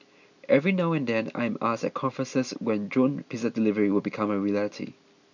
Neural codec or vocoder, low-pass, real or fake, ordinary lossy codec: none; 7.2 kHz; real; none